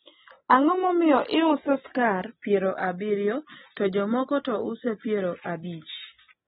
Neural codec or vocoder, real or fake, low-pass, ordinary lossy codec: none; real; 9.9 kHz; AAC, 16 kbps